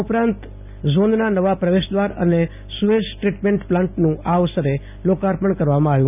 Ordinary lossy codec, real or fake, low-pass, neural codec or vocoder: none; real; 3.6 kHz; none